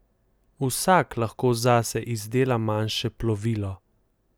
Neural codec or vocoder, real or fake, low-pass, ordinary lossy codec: none; real; none; none